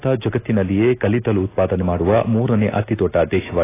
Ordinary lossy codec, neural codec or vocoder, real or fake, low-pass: AAC, 16 kbps; none; real; 3.6 kHz